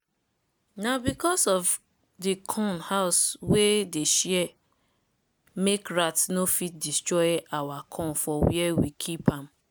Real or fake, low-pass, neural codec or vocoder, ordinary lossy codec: real; none; none; none